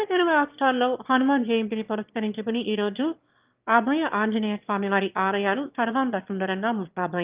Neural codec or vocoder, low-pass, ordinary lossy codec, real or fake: autoencoder, 22.05 kHz, a latent of 192 numbers a frame, VITS, trained on one speaker; 3.6 kHz; Opus, 32 kbps; fake